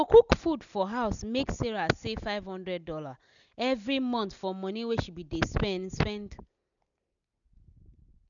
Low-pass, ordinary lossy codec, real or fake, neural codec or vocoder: 7.2 kHz; none; real; none